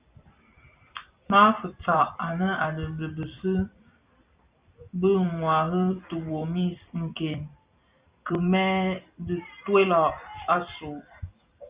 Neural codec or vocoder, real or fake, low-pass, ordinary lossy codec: vocoder, 44.1 kHz, 128 mel bands every 256 samples, BigVGAN v2; fake; 3.6 kHz; Opus, 64 kbps